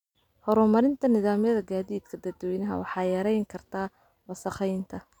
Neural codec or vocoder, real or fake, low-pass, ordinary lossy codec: none; real; 19.8 kHz; none